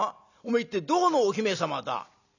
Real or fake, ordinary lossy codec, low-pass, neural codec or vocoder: real; none; 7.2 kHz; none